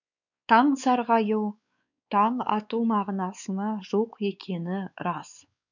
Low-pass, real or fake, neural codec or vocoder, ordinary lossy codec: 7.2 kHz; fake; codec, 16 kHz, 4 kbps, X-Codec, WavLM features, trained on Multilingual LibriSpeech; none